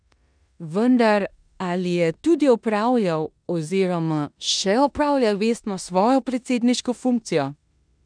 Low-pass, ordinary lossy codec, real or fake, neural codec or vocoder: 9.9 kHz; none; fake; codec, 16 kHz in and 24 kHz out, 0.9 kbps, LongCat-Audio-Codec, four codebook decoder